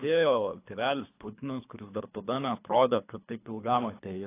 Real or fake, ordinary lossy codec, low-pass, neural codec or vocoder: fake; AAC, 24 kbps; 3.6 kHz; codec, 24 kHz, 3 kbps, HILCodec